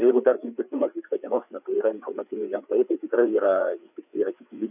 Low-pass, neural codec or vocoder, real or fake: 3.6 kHz; codec, 16 kHz, 4 kbps, FreqCodec, larger model; fake